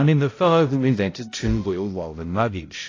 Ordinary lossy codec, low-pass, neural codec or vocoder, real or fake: AAC, 32 kbps; 7.2 kHz; codec, 16 kHz, 0.5 kbps, X-Codec, HuBERT features, trained on balanced general audio; fake